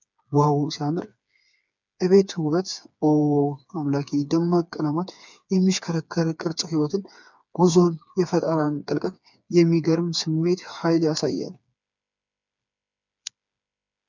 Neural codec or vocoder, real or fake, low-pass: codec, 16 kHz, 4 kbps, FreqCodec, smaller model; fake; 7.2 kHz